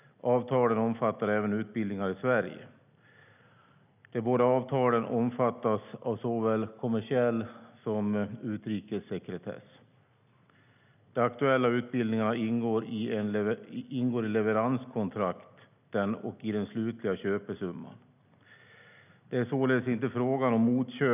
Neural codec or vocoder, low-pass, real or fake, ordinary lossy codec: none; 3.6 kHz; real; none